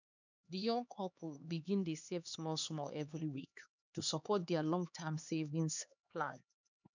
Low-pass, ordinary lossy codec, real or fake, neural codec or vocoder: 7.2 kHz; none; fake; codec, 16 kHz, 2 kbps, X-Codec, HuBERT features, trained on LibriSpeech